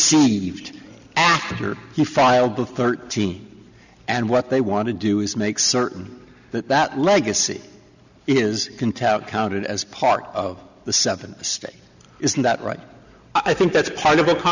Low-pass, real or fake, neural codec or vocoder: 7.2 kHz; real; none